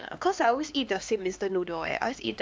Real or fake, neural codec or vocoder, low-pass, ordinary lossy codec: fake; codec, 16 kHz, 2 kbps, X-Codec, HuBERT features, trained on LibriSpeech; none; none